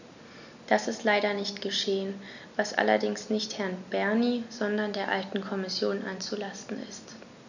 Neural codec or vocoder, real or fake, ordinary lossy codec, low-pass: none; real; none; 7.2 kHz